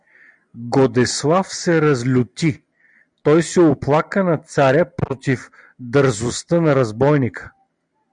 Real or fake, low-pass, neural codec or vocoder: real; 9.9 kHz; none